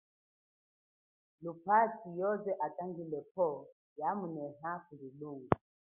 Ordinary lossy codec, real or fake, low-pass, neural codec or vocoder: Opus, 64 kbps; real; 3.6 kHz; none